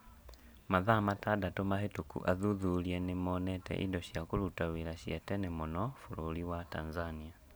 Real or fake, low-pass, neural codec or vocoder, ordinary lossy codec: real; none; none; none